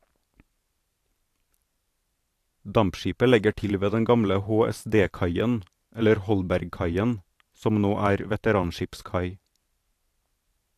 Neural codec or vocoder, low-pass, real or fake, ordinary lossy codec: vocoder, 44.1 kHz, 128 mel bands every 512 samples, BigVGAN v2; 14.4 kHz; fake; AAC, 64 kbps